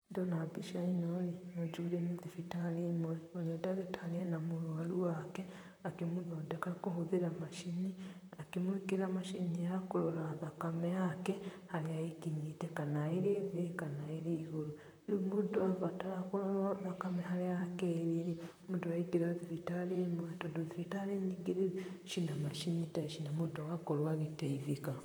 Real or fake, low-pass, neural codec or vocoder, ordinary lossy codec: fake; none; vocoder, 44.1 kHz, 128 mel bands, Pupu-Vocoder; none